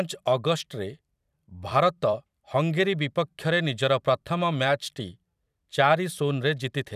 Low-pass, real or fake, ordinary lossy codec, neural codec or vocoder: 14.4 kHz; fake; none; vocoder, 44.1 kHz, 128 mel bands every 256 samples, BigVGAN v2